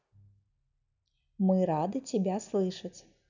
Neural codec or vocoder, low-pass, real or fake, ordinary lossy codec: none; 7.2 kHz; real; none